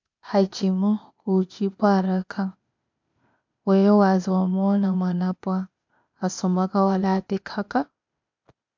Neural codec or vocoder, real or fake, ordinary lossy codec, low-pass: codec, 16 kHz, 0.8 kbps, ZipCodec; fake; MP3, 64 kbps; 7.2 kHz